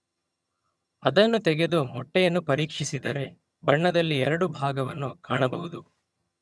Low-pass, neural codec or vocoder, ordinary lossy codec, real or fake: none; vocoder, 22.05 kHz, 80 mel bands, HiFi-GAN; none; fake